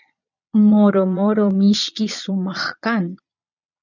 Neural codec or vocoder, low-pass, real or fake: vocoder, 22.05 kHz, 80 mel bands, Vocos; 7.2 kHz; fake